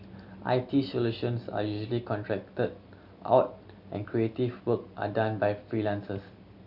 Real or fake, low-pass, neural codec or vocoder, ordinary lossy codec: real; 5.4 kHz; none; none